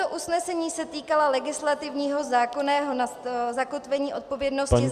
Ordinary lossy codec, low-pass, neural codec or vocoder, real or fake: Opus, 64 kbps; 14.4 kHz; none; real